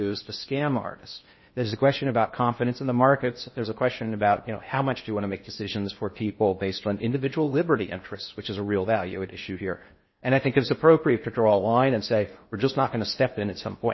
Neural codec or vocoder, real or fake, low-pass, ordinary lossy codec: codec, 16 kHz in and 24 kHz out, 0.6 kbps, FocalCodec, streaming, 2048 codes; fake; 7.2 kHz; MP3, 24 kbps